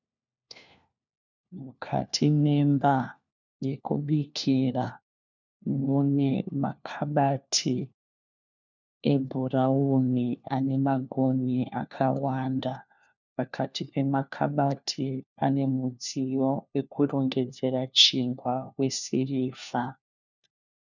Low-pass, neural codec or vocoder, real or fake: 7.2 kHz; codec, 16 kHz, 1 kbps, FunCodec, trained on LibriTTS, 50 frames a second; fake